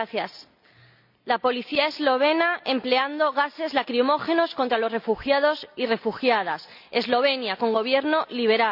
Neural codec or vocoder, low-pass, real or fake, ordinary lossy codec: none; 5.4 kHz; real; none